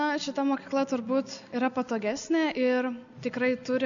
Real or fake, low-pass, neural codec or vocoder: real; 7.2 kHz; none